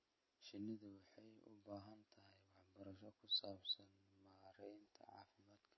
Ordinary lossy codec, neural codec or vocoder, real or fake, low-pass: MP3, 24 kbps; none; real; 7.2 kHz